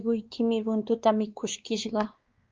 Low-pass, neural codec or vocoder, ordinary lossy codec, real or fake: 7.2 kHz; codec, 16 kHz, 2 kbps, X-Codec, WavLM features, trained on Multilingual LibriSpeech; Opus, 24 kbps; fake